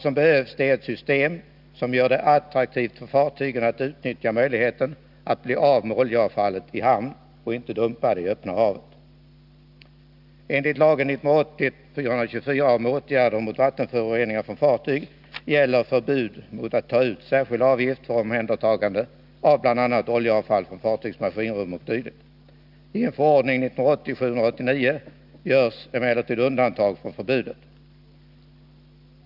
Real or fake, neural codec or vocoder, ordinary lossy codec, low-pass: real; none; none; 5.4 kHz